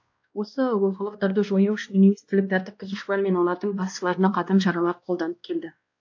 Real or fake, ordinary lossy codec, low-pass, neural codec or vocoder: fake; AAC, 48 kbps; 7.2 kHz; codec, 16 kHz, 1 kbps, X-Codec, WavLM features, trained on Multilingual LibriSpeech